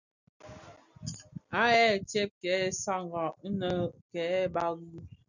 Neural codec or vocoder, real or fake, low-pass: none; real; 7.2 kHz